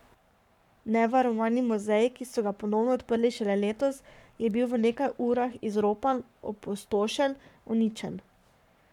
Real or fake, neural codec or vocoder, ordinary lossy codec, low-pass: fake; codec, 44.1 kHz, 7.8 kbps, Pupu-Codec; none; 19.8 kHz